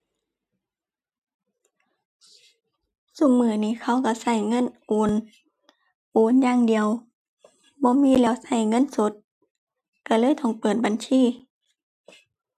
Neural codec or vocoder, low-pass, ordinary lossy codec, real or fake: none; 14.4 kHz; none; real